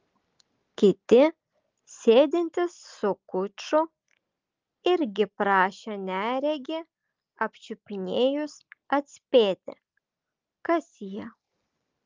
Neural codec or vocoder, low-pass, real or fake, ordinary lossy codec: none; 7.2 kHz; real; Opus, 24 kbps